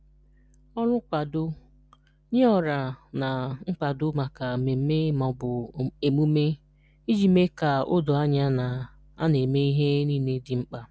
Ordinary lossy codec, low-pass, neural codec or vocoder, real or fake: none; none; none; real